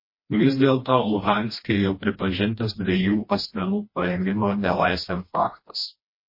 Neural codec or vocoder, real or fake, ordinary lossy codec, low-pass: codec, 16 kHz, 1 kbps, FreqCodec, smaller model; fake; MP3, 24 kbps; 5.4 kHz